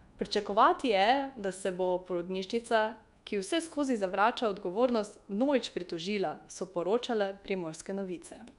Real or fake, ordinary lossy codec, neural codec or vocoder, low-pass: fake; none; codec, 24 kHz, 1.2 kbps, DualCodec; 10.8 kHz